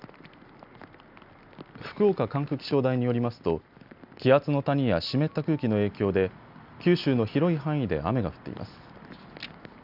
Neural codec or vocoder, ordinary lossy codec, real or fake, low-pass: none; none; real; 5.4 kHz